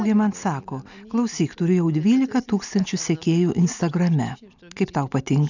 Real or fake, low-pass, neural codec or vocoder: real; 7.2 kHz; none